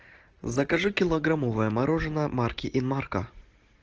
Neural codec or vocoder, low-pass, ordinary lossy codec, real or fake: none; 7.2 kHz; Opus, 16 kbps; real